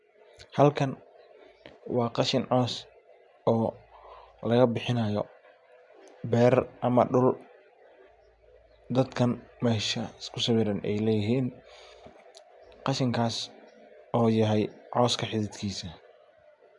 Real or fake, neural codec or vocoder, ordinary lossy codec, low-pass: real; none; MP3, 96 kbps; 10.8 kHz